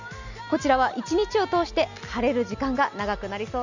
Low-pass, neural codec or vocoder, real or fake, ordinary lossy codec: 7.2 kHz; none; real; none